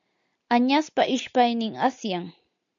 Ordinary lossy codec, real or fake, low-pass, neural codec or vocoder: MP3, 64 kbps; real; 7.2 kHz; none